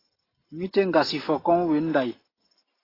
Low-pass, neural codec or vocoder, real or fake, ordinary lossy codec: 5.4 kHz; none; real; AAC, 24 kbps